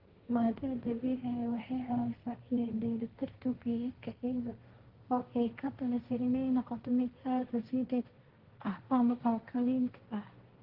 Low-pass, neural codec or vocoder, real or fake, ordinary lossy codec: 5.4 kHz; codec, 16 kHz, 1.1 kbps, Voila-Tokenizer; fake; Opus, 16 kbps